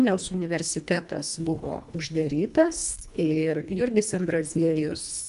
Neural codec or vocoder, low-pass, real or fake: codec, 24 kHz, 1.5 kbps, HILCodec; 10.8 kHz; fake